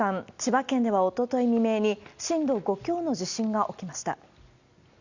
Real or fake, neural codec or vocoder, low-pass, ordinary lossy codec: real; none; 7.2 kHz; Opus, 64 kbps